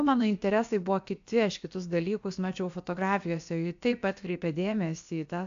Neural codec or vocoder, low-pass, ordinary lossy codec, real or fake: codec, 16 kHz, about 1 kbps, DyCAST, with the encoder's durations; 7.2 kHz; AAC, 64 kbps; fake